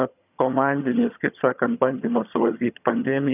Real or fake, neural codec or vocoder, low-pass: fake; vocoder, 22.05 kHz, 80 mel bands, HiFi-GAN; 3.6 kHz